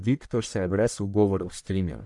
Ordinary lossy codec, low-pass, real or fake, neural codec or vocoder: none; 10.8 kHz; fake; codec, 44.1 kHz, 1.7 kbps, Pupu-Codec